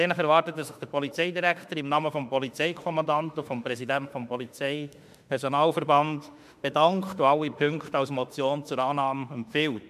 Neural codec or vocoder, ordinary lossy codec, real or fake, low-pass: autoencoder, 48 kHz, 32 numbers a frame, DAC-VAE, trained on Japanese speech; MP3, 96 kbps; fake; 14.4 kHz